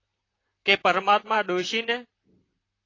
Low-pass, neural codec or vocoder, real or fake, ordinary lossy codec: 7.2 kHz; vocoder, 44.1 kHz, 128 mel bands, Pupu-Vocoder; fake; AAC, 32 kbps